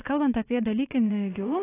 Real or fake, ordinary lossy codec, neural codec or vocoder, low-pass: fake; AAC, 16 kbps; vocoder, 22.05 kHz, 80 mel bands, WaveNeXt; 3.6 kHz